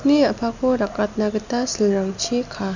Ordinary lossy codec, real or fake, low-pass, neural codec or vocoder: none; real; 7.2 kHz; none